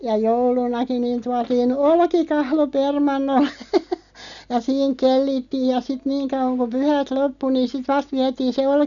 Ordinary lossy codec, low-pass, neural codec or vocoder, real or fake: none; 7.2 kHz; none; real